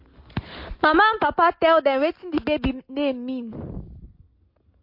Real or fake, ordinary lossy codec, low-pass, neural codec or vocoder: real; MP3, 32 kbps; 5.4 kHz; none